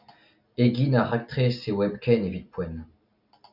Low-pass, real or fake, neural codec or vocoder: 5.4 kHz; real; none